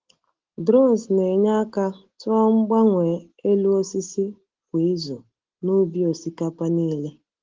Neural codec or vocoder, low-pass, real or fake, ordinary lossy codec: none; 7.2 kHz; real; Opus, 16 kbps